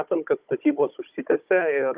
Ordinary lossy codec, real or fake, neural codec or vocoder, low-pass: Opus, 24 kbps; fake; codec, 16 kHz, 16 kbps, FunCodec, trained on Chinese and English, 50 frames a second; 3.6 kHz